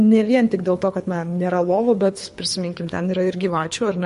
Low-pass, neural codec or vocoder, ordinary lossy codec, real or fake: 10.8 kHz; codec, 24 kHz, 3 kbps, HILCodec; MP3, 48 kbps; fake